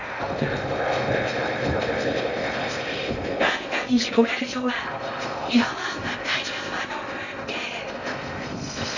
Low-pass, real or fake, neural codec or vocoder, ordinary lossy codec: 7.2 kHz; fake; codec, 16 kHz in and 24 kHz out, 0.6 kbps, FocalCodec, streaming, 2048 codes; none